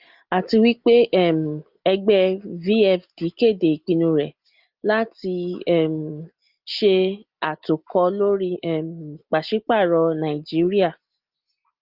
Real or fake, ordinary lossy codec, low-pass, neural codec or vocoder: real; Opus, 24 kbps; 5.4 kHz; none